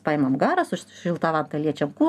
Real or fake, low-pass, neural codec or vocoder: real; 14.4 kHz; none